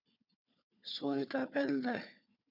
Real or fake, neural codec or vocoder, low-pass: fake; codec, 16 kHz, 4 kbps, FunCodec, trained on Chinese and English, 50 frames a second; 5.4 kHz